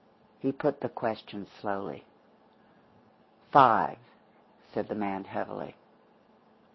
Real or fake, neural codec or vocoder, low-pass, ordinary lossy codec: real; none; 7.2 kHz; MP3, 24 kbps